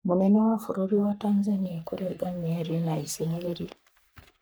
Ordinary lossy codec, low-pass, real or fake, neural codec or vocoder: none; none; fake; codec, 44.1 kHz, 3.4 kbps, Pupu-Codec